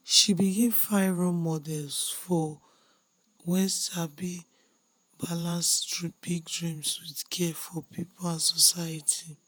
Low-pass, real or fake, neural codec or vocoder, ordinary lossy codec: none; fake; vocoder, 48 kHz, 128 mel bands, Vocos; none